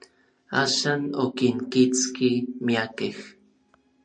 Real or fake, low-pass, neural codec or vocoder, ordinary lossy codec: real; 9.9 kHz; none; MP3, 96 kbps